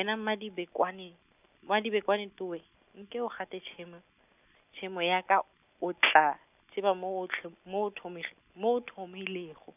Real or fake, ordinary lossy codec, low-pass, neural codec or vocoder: real; none; 3.6 kHz; none